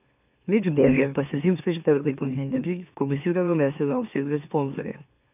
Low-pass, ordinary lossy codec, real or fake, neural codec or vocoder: 3.6 kHz; none; fake; autoencoder, 44.1 kHz, a latent of 192 numbers a frame, MeloTTS